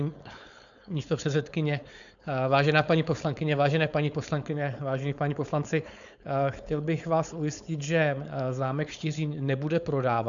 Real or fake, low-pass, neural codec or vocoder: fake; 7.2 kHz; codec, 16 kHz, 4.8 kbps, FACodec